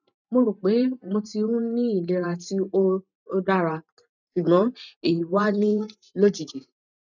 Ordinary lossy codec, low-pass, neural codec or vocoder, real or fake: none; 7.2 kHz; vocoder, 44.1 kHz, 128 mel bands every 512 samples, BigVGAN v2; fake